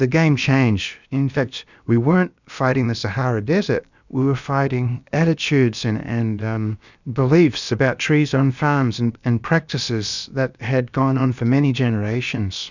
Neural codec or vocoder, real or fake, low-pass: codec, 16 kHz, about 1 kbps, DyCAST, with the encoder's durations; fake; 7.2 kHz